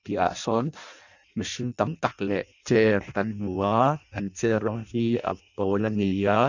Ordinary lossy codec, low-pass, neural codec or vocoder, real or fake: none; 7.2 kHz; codec, 16 kHz in and 24 kHz out, 0.6 kbps, FireRedTTS-2 codec; fake